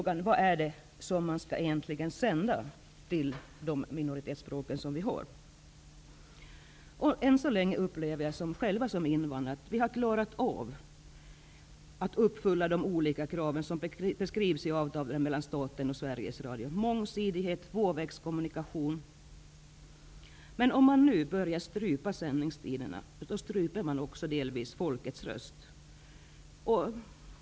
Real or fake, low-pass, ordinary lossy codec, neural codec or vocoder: real; none; none; none